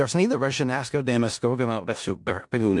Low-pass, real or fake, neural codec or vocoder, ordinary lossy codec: 10.8 kHz; fake; codec, 16 kHz in and 24 kHz out, 0.4 kbps, LongCat-Audio-Codec, four codebook decoder; MP3, 64 kbps